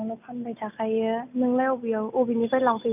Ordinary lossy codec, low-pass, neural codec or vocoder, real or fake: none; 3.6 kHz; none; real